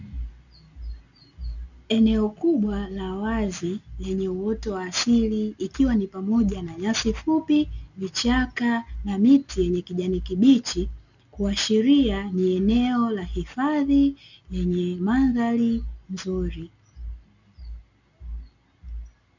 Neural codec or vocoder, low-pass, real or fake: none; 7.2 kHz; real